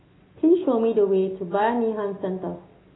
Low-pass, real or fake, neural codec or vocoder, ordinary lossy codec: 7.2 kHz; real; none; AAC, 16 kbps